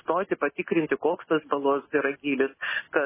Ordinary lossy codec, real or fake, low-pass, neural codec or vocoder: MP3, 16 kbps; real; 3.6 kHz; none